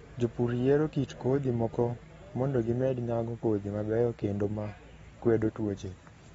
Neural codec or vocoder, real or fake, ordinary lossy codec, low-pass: none; real; AAC, 24 kbps; 19.8 kHz